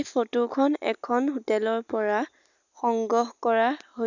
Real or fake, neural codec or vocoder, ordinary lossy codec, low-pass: real; none; none; 7.2 kHz